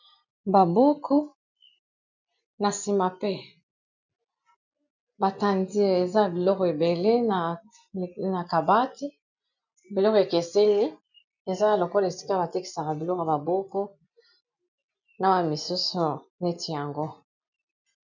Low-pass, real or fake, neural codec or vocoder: 7.2 kHz; real; none